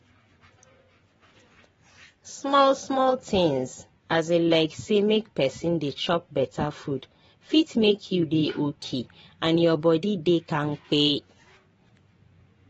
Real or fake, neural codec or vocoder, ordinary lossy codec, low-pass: fake; vocoder, 24 kHz, 100 mel bands, Vocos; AAC, 24 kbps; 10.8 kHz